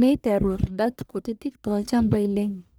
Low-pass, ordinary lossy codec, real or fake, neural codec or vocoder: none; none; fake; codec, 44.1 kHz, 1.7 kbps, Pupu-Codec